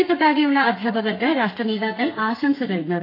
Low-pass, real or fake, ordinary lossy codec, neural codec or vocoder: 5.4 kHz; fake; AAC, 32 kbps; codec, 32 kHz, 1.9 kbps, SNAC